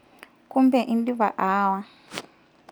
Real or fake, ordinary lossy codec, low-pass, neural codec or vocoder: real; none; 19.8 kHz; none